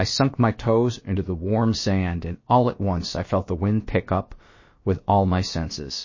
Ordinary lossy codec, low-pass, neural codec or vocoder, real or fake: MP3, 32 kbps; 7.2 kHz; codec, 16 kHz, about 1 kbps, DyCAST, with the encoder's durations; fake